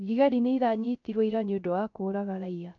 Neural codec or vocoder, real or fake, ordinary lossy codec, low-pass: codec, 16 kHz, 0.3 kbps, FocalCodec; fake; none; 7.2 kHz